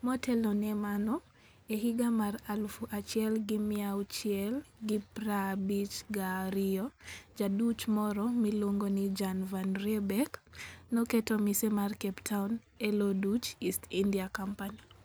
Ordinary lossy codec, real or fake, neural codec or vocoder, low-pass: none; real; none; none